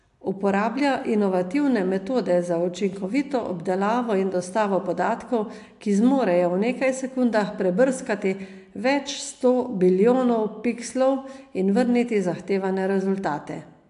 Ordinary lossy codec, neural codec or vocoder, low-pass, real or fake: AAC, 64 kbps; none; 10.8 kHz; real